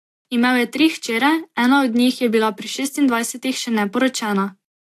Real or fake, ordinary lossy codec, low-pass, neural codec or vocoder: real; AAC, 64 kbps; 14.4 kHz; none